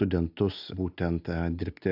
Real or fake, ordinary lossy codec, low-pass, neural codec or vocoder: real; AAC, 48 kbps; 5.4 kHz; none